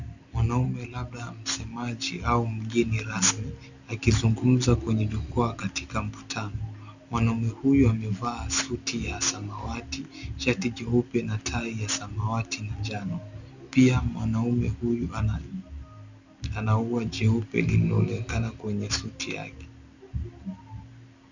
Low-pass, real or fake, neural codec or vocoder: 7.2 kHz; real; none